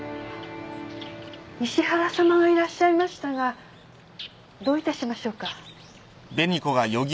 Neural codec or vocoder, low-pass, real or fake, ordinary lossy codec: none; none; real; none